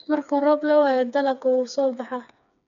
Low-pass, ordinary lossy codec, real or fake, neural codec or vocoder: 7.2 kHz; MP3, 96 kbps; fake; codec, 16 kHz, 4 kbps, FreqCodec, smaller model